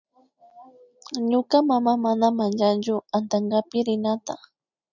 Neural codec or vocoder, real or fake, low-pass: none; real; 7.2 kHz